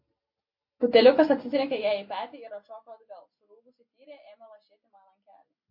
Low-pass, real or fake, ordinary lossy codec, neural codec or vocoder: 5.4 kHz; real; MP3, 32 kbps; none